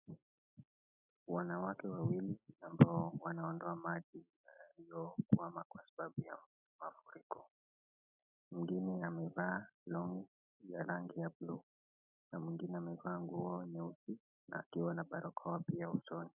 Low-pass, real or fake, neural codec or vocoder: 3.6 kHz; real; none